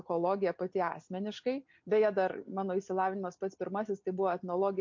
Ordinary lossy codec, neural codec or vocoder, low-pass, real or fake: MP3, 48 kbps; none; 7.2 kHz; real